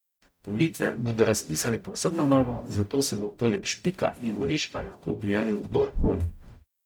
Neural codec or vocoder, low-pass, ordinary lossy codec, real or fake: codec, 44.1 kHz, 0.9 kbps, DAC; none; none; fake